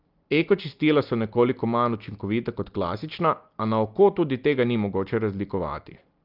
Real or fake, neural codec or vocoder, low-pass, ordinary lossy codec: real; none; 5.4 kHz; Opus, 24 kbps